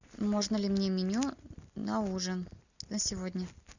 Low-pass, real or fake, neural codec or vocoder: 7.2 kHz; real; none